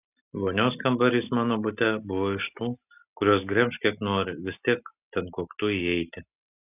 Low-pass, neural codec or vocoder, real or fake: 3.6 kHz; none; real